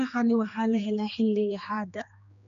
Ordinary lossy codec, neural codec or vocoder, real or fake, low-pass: none; codec, 16 kHz, 2 kbps, X-Codec, HuBERT features, trained on general audio; fake; 7.2 kHz